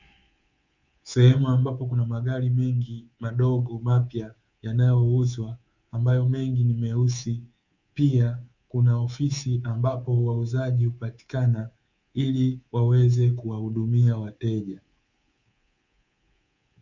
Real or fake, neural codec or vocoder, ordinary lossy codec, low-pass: fake; codec, 24 kHz, 3.1 kbps, DualCodec; Opus, 64 kbps; 7.2 kHz